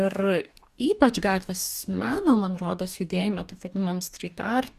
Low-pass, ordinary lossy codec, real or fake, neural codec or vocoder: 14.4 kHz; Opus, 64 kbps; fake; codec, 44.1 kHz, 2.6 kbps, DAC